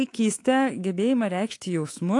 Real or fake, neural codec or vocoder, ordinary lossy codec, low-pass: fake; codec, 24 kHz, 3.1 kbps, DualCodec; AAC, 48 kbps; 10.8 kHz